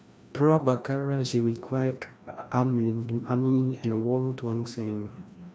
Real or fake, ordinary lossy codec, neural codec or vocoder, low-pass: fake; none; codec, 16 kHz, 1 kbps, FreqCodec, larger model; none